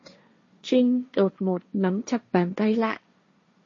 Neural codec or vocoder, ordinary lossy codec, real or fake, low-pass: codec, 16 kHz, 1.1 kbps, Voila-Tokenizer; MP3, 32 kbps; fake; 7.2 kHz